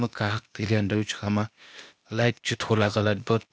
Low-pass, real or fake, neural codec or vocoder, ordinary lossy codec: none; fake; codec, 16 kHz, 0.8 kbps, ZipCodec; none